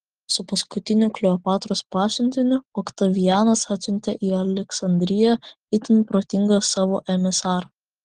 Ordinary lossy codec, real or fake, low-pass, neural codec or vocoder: Opus, 16 kbps; real; 9.9 kHz; none